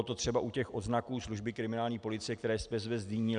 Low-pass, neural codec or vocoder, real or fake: 9.9 kHz; none; real